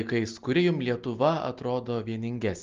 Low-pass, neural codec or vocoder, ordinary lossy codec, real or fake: 7.2 kHz; none; Opus, 32 kbps; real